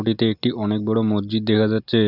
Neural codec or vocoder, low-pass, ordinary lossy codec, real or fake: none; 5.4 kHz; none; real